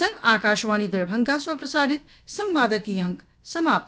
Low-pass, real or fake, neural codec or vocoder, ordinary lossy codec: none; fake; codec, 16 kHz, about 1 kbps, DyCAST, with the encoder's durations; none